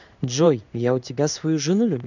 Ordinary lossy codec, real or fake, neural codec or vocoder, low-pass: none; fake; codec, 16 kHz in and 24 kHz out, 1 kbps, XY-Tokenizer; 7.2 kHz